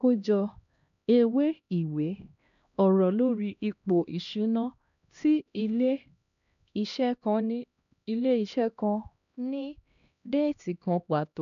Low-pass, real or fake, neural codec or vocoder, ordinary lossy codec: 7.2 kHz; fake; codec, 16 kHz, 1 kbps, X-Codec, HuBERT features, trained on LibriSpeech; MP3, 96 kbps